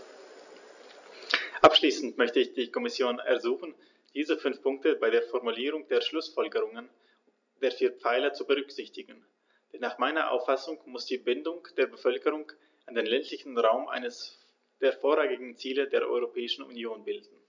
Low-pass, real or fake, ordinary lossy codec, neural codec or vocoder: 7.2 kHz; real; none; none